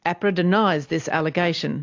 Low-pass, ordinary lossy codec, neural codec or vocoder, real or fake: 7.2 kHz; AAC, 48 kbps; none; real